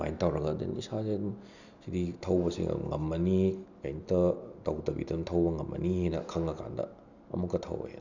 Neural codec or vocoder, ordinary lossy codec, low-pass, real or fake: none; none; 7.2 kHz; real